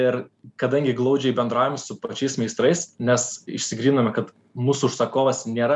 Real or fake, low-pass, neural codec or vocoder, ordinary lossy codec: real; 10.8 kHz; none; MP3, 96 kbps